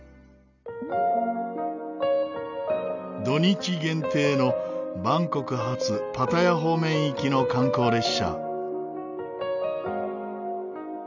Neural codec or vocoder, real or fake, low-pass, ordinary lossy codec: none; real; 7.2 kHz; none